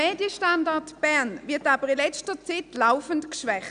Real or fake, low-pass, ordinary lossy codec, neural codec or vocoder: real; 9.9 kHz; none; none